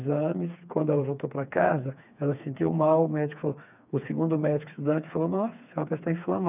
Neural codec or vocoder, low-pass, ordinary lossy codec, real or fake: codec, 16 kHz, 4 kbps, FreqCodec, smaller model; 3.6 kHz; none; fake